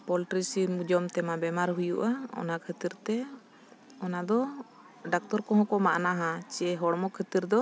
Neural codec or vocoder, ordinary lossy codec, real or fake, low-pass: none; none; real; none